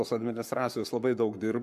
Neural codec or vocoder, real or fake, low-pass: codec, 44.1 kHz, 7.8 kbps, Pupu-Codec; fake; 14.4 kHz